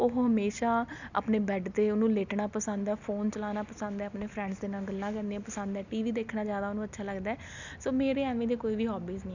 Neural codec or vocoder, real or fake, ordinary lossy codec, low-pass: none; real; none; 7.2 kHz